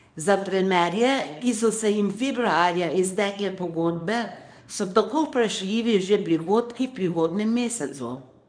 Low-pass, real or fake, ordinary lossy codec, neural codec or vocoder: 9.9 kHz; fake; MP3, 96 kbps; codec, 24 kHz, 0.9 kbps, WavTokenizer, small release